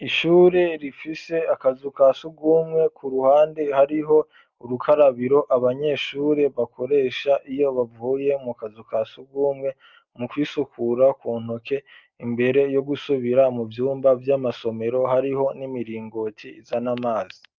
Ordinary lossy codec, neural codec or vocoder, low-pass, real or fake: Opus, 24 kbps; none; 7.2 kHz; real